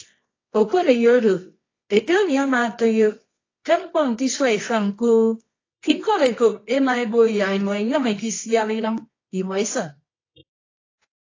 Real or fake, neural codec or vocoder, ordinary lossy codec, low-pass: fake; codec, 24 kHz, 0.9 kbps, WavTokenizer, medium music audio release; AAC, 32 kbps; 7.2 kHz